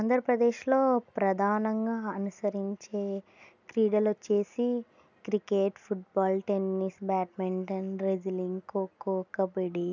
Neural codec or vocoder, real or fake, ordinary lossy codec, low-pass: none; real; none; 7.2 kHz